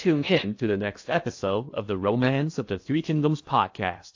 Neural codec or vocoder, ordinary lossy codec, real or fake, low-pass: codec, 16 kHz in and 24 kHz out, 0.8 kbps, FocalCodec, streaming, 65536 codes; AAC, 48 kbps; fake; 7.2 kHz